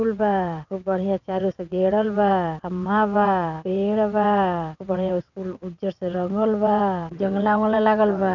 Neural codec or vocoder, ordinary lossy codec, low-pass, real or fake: vocoder, 22.05 kHz, 80 mel bands, WaveNeXt; none; 7.2 kHz; fake